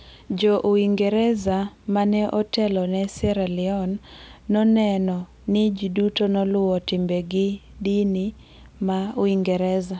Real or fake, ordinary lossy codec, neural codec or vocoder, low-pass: real; none; none; none